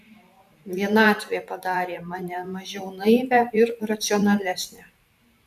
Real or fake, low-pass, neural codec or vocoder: fake; 14.4 kHz; vocoder, 44.1 kHz, 128 mel bands every 512 samples, BigVGAN v2